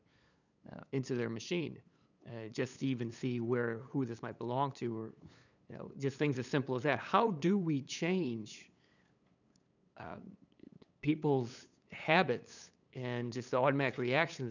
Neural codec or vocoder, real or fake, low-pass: codec, 16 kHz, 8 kbps, FunCodec, trained on LibriTTS, 25 frames a second; fake; 7.2 kHz